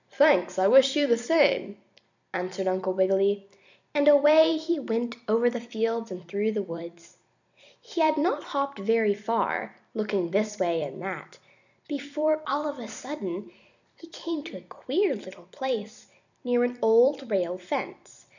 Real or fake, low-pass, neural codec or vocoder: fake; 7.2 kHz; vocoder, 44.1 kHz, 128 mel bands every 256 samples, BigVGAN v2